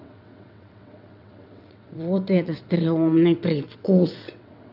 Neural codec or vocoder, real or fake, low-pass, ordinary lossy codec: vocoder, 22.05 kHz, 80 mel bands, WaveNeXt; fake; 5.4 kHz; none